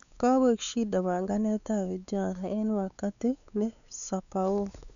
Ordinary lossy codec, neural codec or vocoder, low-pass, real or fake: none; codec, 16 kHz, 4 kbps, X-Codec, WavLM features, trained on Multilingual LibriSpeech; 7.2 kHz; fake